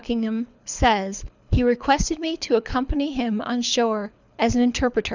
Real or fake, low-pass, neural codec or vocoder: fake; 7.2 kHz; codec, 24 kHz, 6 kbps, HILCodec